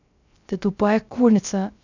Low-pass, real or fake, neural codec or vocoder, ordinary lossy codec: 7.2 kHz; fake; codec, 16 kHz, 0.3 kbps, FocalCodec; none